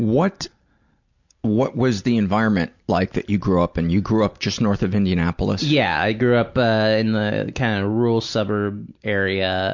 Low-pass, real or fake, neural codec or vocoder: 7.2 kHz; real; none